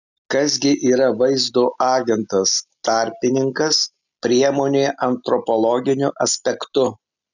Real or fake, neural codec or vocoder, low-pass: real; none; 7.2 kHz